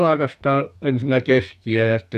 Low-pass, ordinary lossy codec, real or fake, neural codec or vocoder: 14.4 kHz; none; fake; codec, 44.1 kHz, 2.6 kbps, SNAC